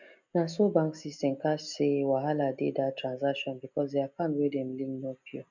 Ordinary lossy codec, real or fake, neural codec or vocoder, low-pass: none; real; none; 7.2 kHz